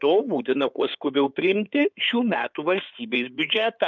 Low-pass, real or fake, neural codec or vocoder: 7.2 kHz; fake; codec, 16 kHz in and 24 kHz out, 2.2 kbps, FireRedTTS-2 codec